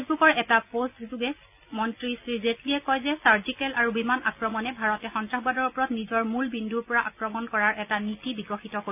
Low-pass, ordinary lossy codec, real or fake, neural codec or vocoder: 3.6 kHz; none; real; none